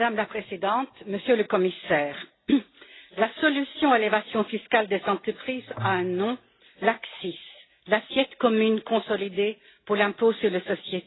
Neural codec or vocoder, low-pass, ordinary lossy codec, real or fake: none; 7.2 kHz; AAC, 16 kbps; real